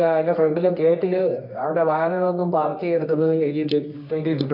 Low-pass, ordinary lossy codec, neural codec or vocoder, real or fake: 5.4 kHz; none; codec, 24 kHz, 0.9 kbps, WavTokenizer, medium music audio release; fake